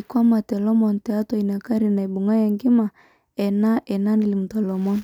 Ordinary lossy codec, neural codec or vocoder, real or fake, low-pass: none; none; real; 19.8 kHz